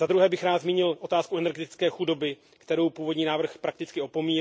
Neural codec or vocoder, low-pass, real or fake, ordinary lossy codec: none; none; real; none